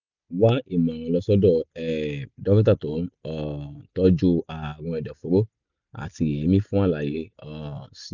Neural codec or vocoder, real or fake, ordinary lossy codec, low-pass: none; real; none; 7.2 kHz